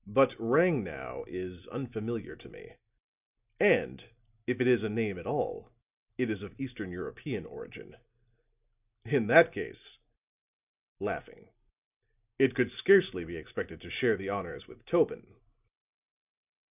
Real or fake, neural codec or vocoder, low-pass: real; none; 3.6 kHz